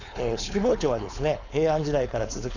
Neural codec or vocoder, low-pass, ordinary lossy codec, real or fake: codec, 16 kHz, 4.8 kbps, FACodec; 7.2 kHz; none; fake